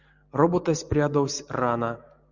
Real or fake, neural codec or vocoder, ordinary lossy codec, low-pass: real; none; Opus, 64 kbps; 7.2 kHz